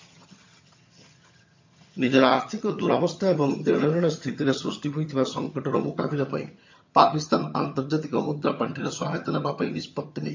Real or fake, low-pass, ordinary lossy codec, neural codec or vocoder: fake; 7.2 kHz; MP3, 48 kbps; vocoder, 22.05 kHz, 80 mel bands, HiFi-GAN